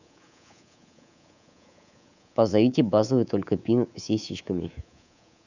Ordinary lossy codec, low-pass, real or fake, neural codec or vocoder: none; 7.2 kHz; fake; codec, 24 kHz, 3.1 kbps, DualCodec